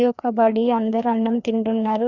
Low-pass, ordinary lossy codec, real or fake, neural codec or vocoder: 7.2 kHz; Opus, 64 kbps; fake; codec, 16 kHz, 2 kbps, FreqCodec, larger model